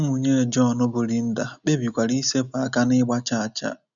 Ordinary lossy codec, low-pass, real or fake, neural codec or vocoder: none; 7.2 kHz; real; none